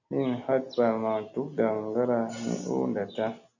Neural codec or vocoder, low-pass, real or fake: none; 7.2 kHz; real